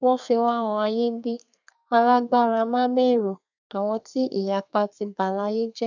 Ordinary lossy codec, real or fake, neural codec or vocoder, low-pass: none; fake; codec, 32 kHz, 1.9 kbps, SNAC; 7.2 kHz